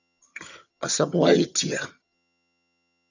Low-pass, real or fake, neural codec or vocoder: 7.2 kHz; fake; vocoder, 22.05 kHz, 80 mel bands, HiFi-GAN